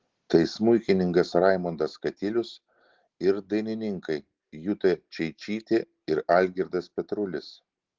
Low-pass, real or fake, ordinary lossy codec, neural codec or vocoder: 7.2 kHz; real; Opus, 16 kbps; none